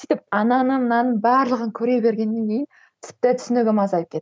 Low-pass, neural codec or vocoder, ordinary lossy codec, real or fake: none; none; none; real